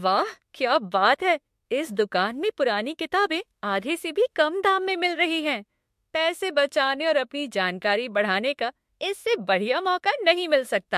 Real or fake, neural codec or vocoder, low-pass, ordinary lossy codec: fake; autoencoder, 48 kHz, 32 numbers a frame, DAC-VAE, trained on Japanese speech; 14.4 kHz; MP3, 64 kbps